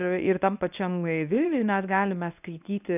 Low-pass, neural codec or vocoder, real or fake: 3.6 kHz; codec, 24 kHz, 0.9 kbps, WavTokenizer, medium speech release version 1; fake